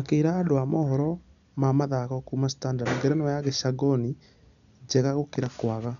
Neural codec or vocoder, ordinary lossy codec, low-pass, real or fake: none; none; 7.2 kHz; real